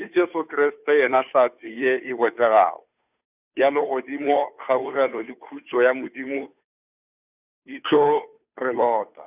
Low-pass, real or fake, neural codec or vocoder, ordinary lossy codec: 3.6 kHz; fake; codec, 16 kHz, 2 kbps, FunCodec, trained on Chinese and English, 25 frames a second; none